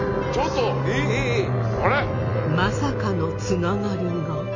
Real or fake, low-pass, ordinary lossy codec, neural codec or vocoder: real; 7.2 kHz; MP3, 48 kbps; none